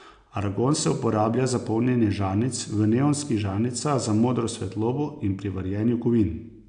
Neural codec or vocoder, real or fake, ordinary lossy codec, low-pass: none; real; AAC, 96 kbps; 9.9 kHz